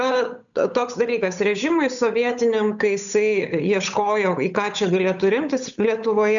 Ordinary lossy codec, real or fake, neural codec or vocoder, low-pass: MP3, 96 kbps; fake; codec, 16 kHz, 8 kbps, FunCodec, trained on Chinese and English, 25 frames a second; 7.2 kHz